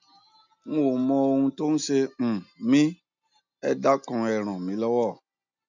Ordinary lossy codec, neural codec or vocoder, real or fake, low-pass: none; none; real; 7.2 kHz